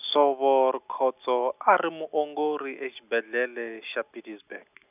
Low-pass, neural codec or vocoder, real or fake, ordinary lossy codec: 3.6 kHz; none; real; none